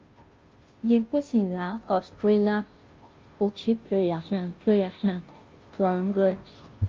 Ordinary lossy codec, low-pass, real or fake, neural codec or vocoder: Opus, 24 kbps; 7.2 kHz; fake; codec, 16 kHz, 0.5 kbps, FunCodec, trained on Chinese and English, 25 frames a second